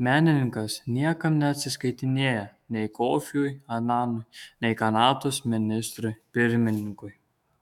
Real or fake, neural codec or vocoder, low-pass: fake; autoencoder, 48 kHz, 128 numbers a frame, DAC-VAE, trained on Japanese speech; 19.8 kHz